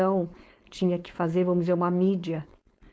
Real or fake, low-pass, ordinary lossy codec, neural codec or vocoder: fake; none; none; codec, 16 kHz, 4.8 kbps, FACodec